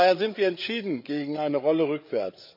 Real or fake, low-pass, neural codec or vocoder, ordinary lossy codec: fake; 5.4 kHz; codec, 16 kHz, 8 kbps, FreqCodec, larger model; MP3, 48 kbps